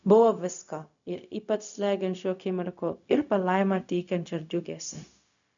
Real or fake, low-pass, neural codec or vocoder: fake; 7.2 kHz; codec, 16 kHz, 0.4 kbps, LongCat-Audio-Codec